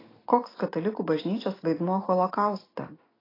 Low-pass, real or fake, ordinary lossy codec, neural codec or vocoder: 5.4 kHz; real; AAC, 24 kbps; none